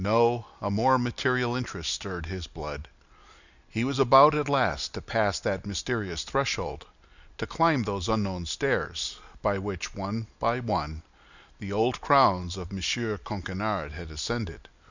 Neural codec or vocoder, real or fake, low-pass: none; real; 7.2 kHz